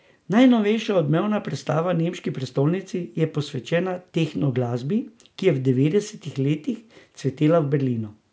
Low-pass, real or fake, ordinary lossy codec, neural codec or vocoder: none; real; none; none